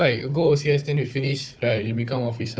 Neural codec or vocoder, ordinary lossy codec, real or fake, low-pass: codec, 16 kHz, 4 kbps, FunCodec, trained on Chinese and English, 50 frames a second; none; fake; none